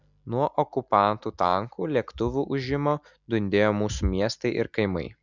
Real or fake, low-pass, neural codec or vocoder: real; 7.2 kHz; none